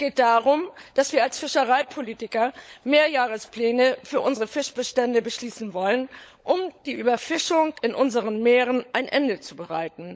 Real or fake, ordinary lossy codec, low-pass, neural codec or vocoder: fake; none; none; codec, 16 kHz, 16 kbps, FunCodec, trained on LibriTTS, 50 frames a second